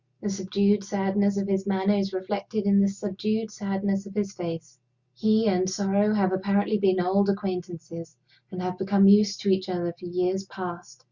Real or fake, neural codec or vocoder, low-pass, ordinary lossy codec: real; none; 7.2 kHz; Opus, 64 kbps